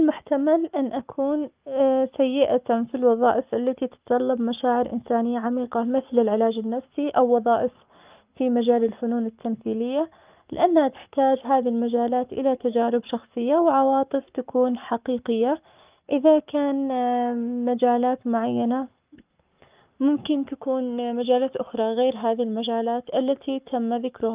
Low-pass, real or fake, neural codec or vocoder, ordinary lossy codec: 3.6 kHz; fake; codec, 44.1 kHz, 7.8 kbps, Pupu-Codec; Opus, 24 kbps